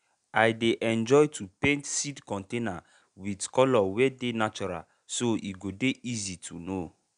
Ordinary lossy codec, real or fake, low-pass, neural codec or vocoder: none; real; 9.9 kHz; none